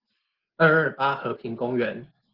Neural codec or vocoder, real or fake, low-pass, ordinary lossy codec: none; real; 5.4 kHz; Opus, 16 kbps